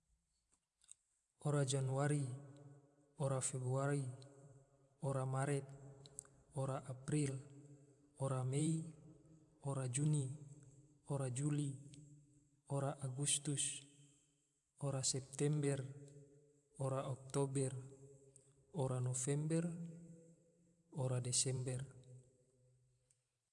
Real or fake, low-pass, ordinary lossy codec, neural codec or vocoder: fake; 10.8 kHz; none; vocoder, 44.1 kHz, 128 mel bands every 512 samples, BigVGAN v2